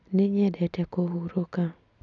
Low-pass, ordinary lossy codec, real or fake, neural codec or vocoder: 7.2 kHz; none; real; none